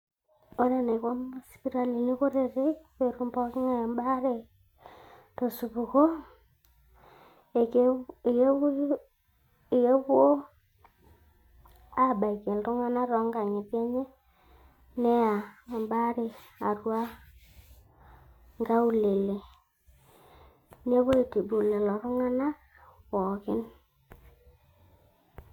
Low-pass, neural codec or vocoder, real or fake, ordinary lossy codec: 19.8 kHz; none; real; none